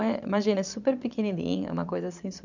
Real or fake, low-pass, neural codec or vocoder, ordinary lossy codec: fake; 7.2 kHz; vocoder, 22.05 kHz, 80 mel bands, Vocos; none